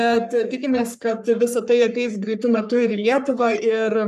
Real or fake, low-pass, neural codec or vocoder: fake; 14.4 kHz; codec, 44.1 kHz, 3.4 kbps, Pupu-Codec